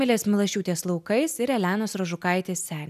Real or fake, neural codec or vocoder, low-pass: real; none; 14.4 kHz